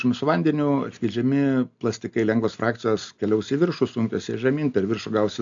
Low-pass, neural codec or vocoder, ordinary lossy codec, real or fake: 7.2 kHz; none; AAC, 64 kbps; real